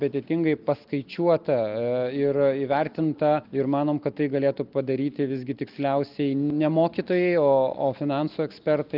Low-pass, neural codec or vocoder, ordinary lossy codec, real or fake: 5.4 kHz; none; Opus, 32 kbps; real